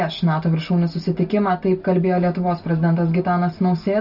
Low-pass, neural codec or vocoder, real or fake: 5.4 kHz; none; real